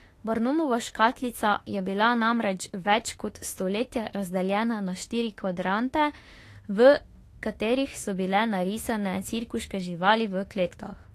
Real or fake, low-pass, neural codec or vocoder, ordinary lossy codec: fake; 14.4 kHz; autoencoder, 48 kHz, 32 numbers a frame, DAC-VAE, trained on Japanese speech; AAC, 48 kbps